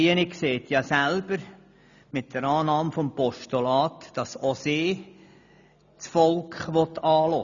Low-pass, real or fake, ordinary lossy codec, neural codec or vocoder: 7.2 kHz; real; none; none